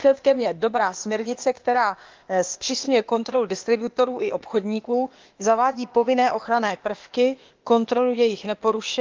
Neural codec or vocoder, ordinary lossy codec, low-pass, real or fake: codec, 16 kHz, 0.8 kbps, ZipCodec; Opus, 32 kbps; 7.2 kHz; fake